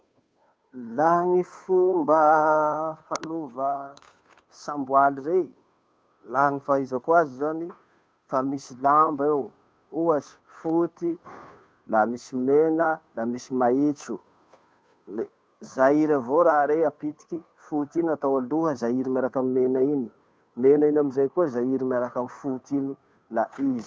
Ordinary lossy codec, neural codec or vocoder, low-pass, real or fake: none; codec, 16 kHz, 2 kbps, FunCodec, trained on Chinese and English, 25 frames a second; none; fake